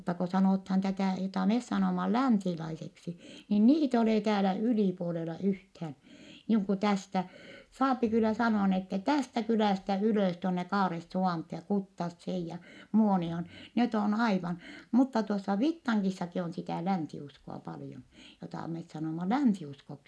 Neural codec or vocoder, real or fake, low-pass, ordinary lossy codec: none; real; none; none